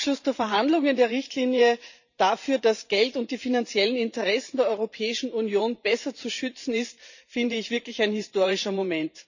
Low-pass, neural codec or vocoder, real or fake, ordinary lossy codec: 7.2 kHz; vocoder, 44.1 kHz, 128 mel bands every 512 samples, BigVGAN v2; fake; none